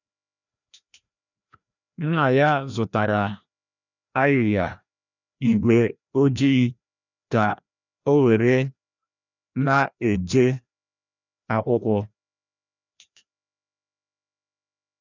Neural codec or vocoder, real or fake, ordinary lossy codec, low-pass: codec, 16 kHz, 1 kbps, FreqCodec, larger model; fake; none; 7.2 kHz